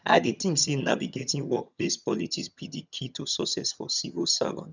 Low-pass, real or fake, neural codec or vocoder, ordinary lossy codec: 7.2 kHz; fake; vocoder, 22.05 kHz, 80 mel bands, HiFi-GAN; none